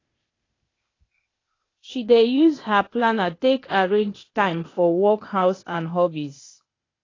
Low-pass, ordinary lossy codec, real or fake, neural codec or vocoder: 7.2 kHz; AAC, 32 kbps; fake; codec, 16 kHz, 0.8 kbps, ZipCodec